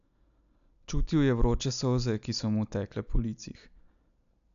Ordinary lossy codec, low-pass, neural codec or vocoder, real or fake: none; 7.2 kHz; none; real